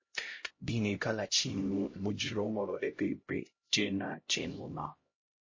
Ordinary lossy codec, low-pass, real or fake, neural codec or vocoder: MP3, 32 kbps; 7.2 kHz; fake; codec, 16 kHz, 0.5 kbps, X-Codec, HuBERT features, trained on LibriSpeech